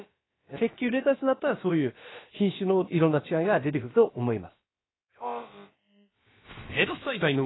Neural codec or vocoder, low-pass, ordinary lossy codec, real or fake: codec, 16 kHz, about 1 kbps, DyCAST, with the encoder's durations; 7.2 kHz; AAC, 16 kbps; fake